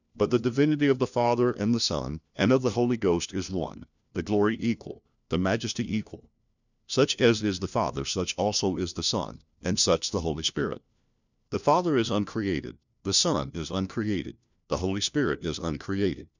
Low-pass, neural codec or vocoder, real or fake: 7.2 kHz; codec, 16 kHz, 1 kbps, FunCodec, trained on LibriTTS, 50 frames a second; fake